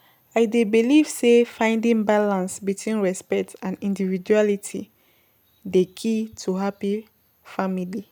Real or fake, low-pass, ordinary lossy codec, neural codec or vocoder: real; none; none; none